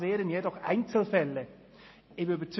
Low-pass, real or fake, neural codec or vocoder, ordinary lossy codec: 7.2 kHz; real; none; MP3, 24 kbps